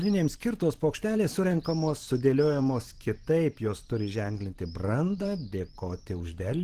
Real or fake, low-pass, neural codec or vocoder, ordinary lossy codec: fake; 14.4 kHz; vocoder, 44.1 kHz, 128 mel bands every 512 samples, BigVGAN v2; Opus, 16 kbps